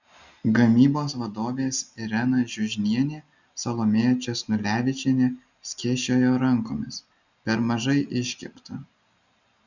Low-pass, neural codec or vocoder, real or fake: 7.2 kHz; none; real